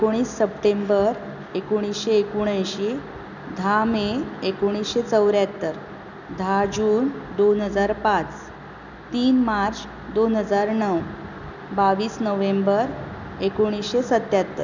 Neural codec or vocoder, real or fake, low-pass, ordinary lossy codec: none; real; 7.2 kHz; none